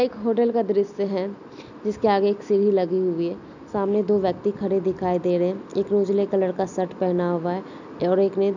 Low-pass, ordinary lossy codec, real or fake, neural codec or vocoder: 7.2 kHz; none; real; none